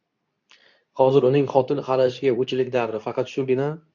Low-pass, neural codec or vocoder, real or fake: 7.2 kHz; codec, 24 kHz, 0.9 kbps, WavTokenizer, medium speech release version 2; fake